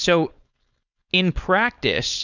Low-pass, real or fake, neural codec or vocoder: 7.2 kHz; fake; codec, 16 kHz, 4.8 kbps, FACodec